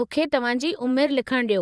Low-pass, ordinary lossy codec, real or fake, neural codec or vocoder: none; none; fake; vocoder, 22.05 kHz, 80 mel bands, Vocos